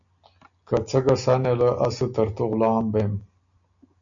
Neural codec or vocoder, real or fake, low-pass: none; real; 7.2 kHz